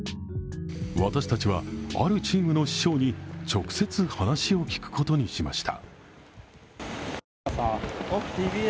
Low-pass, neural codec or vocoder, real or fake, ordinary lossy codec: none; none; real; none